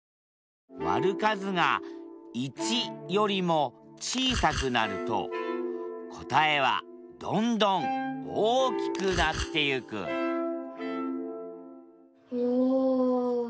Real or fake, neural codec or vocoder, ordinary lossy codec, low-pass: real; none; none; none